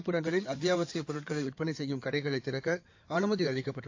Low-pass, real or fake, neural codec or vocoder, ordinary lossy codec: 7.2 kHz; fake; codec, 16 kHz in and 24 kHz out, 2.2 kbps, FireRedTTS-2 codec; AAC, 48 kbps